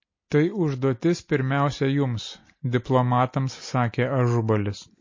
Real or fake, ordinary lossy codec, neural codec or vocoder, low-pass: real; MP3, 32 kbps; none; 7.2 kHz